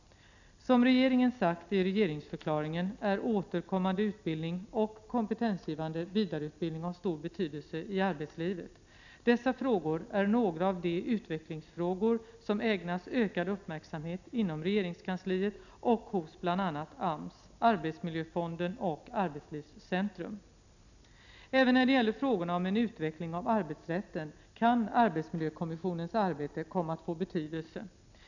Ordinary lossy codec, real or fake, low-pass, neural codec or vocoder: none; real; 7.2 kHz; none